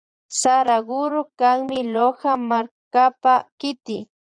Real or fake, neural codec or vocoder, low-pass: fake; vocoder, 22.05 kHz, 80 mel bands, Vocos; 9.9 kHz